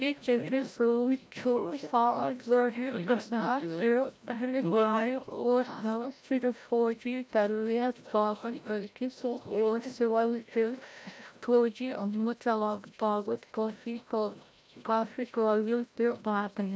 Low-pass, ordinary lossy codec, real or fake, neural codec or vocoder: none; none; fake; codec, 16 kHz, 0.5 kbps, FreqCodec, larger model